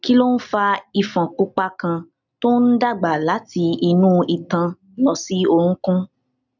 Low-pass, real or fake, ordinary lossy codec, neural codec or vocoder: 7.2 kHz; real; none; none